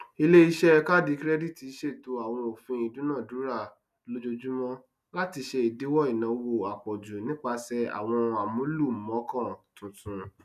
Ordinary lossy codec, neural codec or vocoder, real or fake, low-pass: none; none; real; 14.4 kHz